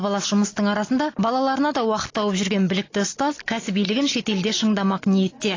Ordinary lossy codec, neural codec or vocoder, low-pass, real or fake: AAC, 32 kbps; none; 7.2 kHz; real